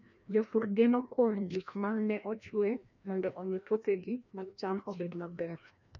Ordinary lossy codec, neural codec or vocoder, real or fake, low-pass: none; codec, 16 kHz, 1 kbps, FreqCodec, larger model; fake; 7.2 kHz